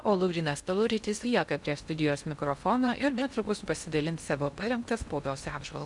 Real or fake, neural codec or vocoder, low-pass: fake; codec, 16 kHz in and 24 kHz out, 0.6 kbps, FocalCodec, streaming, 2048 codes; 10.8 kHz